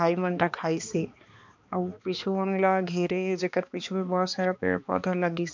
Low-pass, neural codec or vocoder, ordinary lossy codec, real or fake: 7.2 kHz; codec, 16 kHz, 2 kbps, X-Codec, HuBERT features, trained on balanced general audio; MP3, 64 kbps; fake